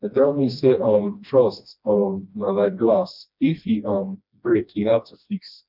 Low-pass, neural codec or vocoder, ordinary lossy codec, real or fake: 5.4 kHz; codec, 16 kHz, 1 kbps, FreqCodec, smaller model; none; fake